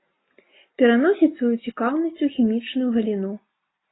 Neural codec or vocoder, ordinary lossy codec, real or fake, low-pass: none; AAC, 16 kbps; real; 7.2 kHz